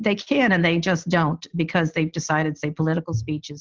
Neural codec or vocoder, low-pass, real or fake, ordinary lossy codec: none; 7.2 kHz; real; Opus, 24 kbps